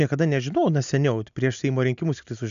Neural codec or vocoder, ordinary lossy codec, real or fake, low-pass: none; MP3, 96 kbps; real; 7.2 kHz